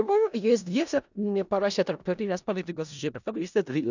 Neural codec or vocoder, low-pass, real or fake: codec, 16 kHz in and 24 kHz out, 0.4 kbps, LongCat-Audio-Codec, four codebook decoder; 7.2 kHz; fake